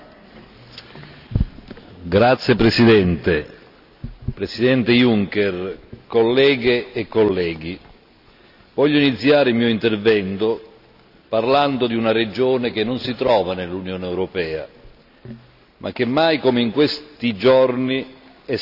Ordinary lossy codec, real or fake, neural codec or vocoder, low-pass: none; real; none; 5.4 kHz